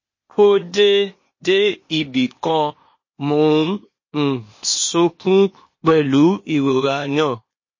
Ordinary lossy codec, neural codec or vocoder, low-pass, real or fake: MP3, 32 kbps; codec, 16 kHz, 0.8 kbps, ZipCodec; 7.2 kHz; fake